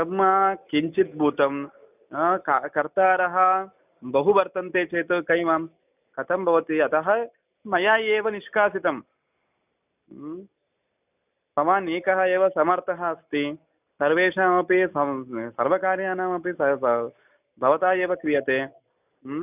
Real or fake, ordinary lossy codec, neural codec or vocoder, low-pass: real; none; none; 3.6 kHz